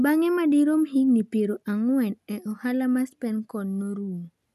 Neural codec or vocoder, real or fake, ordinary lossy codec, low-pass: none; real; none; 14.4 kHz